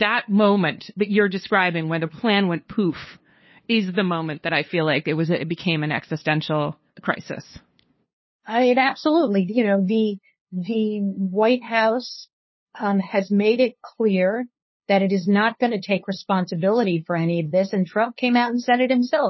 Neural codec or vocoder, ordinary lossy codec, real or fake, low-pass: codec, 16 kHz, 2 kbps, FunCodec, trained on LibriTTS, 25 frames a second; MP3, 24 kbps; fake; 7.2 kHz